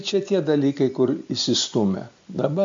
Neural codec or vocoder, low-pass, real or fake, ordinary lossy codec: none; 7.2 kHz; real; MP3, 48 kbps